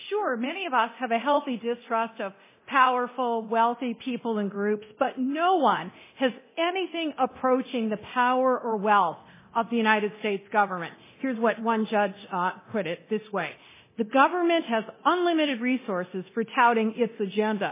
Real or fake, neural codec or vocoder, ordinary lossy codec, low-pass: fake; codec, 24 kHz, 0.9 kbps, DualCodec; MP3, 16 kbps; 3.6 kHz